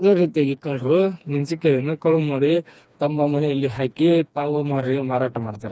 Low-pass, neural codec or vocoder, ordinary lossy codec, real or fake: none; codec, 16 kHz, 2 kbps, FreqCodec, smaller model; none; fake